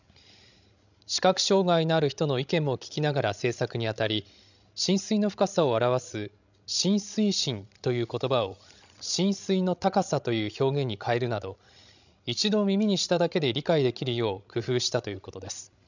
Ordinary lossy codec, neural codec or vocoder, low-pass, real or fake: none; codec, 16 kHz, 16 kbps, FreqCodec, larger model; 7.2 kHz; fake